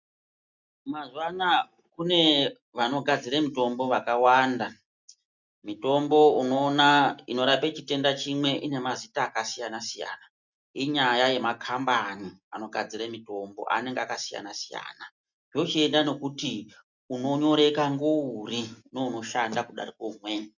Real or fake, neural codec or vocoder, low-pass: real; none; 7.2 kHz